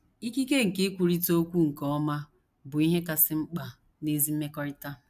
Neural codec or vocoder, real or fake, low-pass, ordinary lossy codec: none; real; 14.4 kHz; none